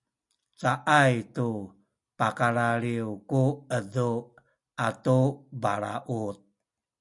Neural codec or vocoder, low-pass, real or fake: none; 10.8 kHz; real